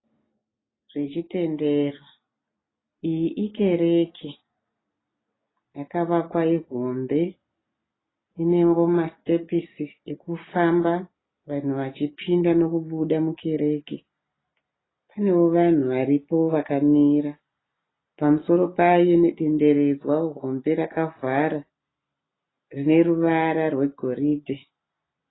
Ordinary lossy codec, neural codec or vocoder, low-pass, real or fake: AAC, 16 kbps; none; 7.2 kHz; real